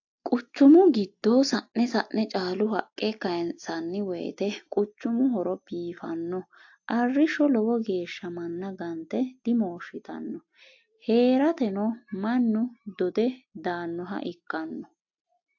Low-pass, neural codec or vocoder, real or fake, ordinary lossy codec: 7.2 kHz; none; real; AAC, 48 kbps